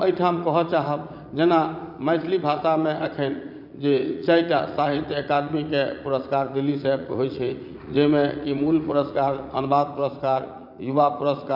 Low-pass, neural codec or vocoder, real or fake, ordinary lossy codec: 5.4 kHz; vocoder, 44.1 kHz, 80 mel bands, Vocos; fake; none